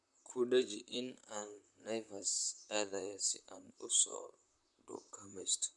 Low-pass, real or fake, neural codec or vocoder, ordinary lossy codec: 10.8 kHz; fake; vocoder, 44.1 kHz, 128 mel bands, Pupu-Vocoder; none